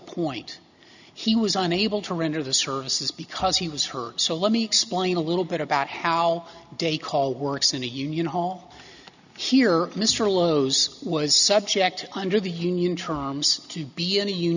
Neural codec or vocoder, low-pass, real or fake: none; 7.2 kHz; real